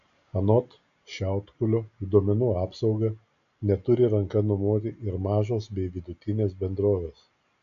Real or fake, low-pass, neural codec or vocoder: real; 7.2 kHz; none